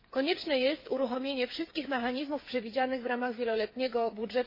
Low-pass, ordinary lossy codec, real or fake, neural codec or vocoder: 5.4 kHz; MP3, 24 kbps; fake; codec, 16 kHz, 8 kbps, FreqCodec, smaller model